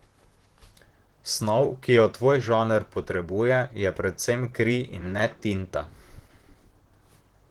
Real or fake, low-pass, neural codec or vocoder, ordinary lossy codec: fake; 19.8 kHz; vocoder, 44.1 kHz, 128 mel bands, Pupu-Vocoder; Opus, 16 kbps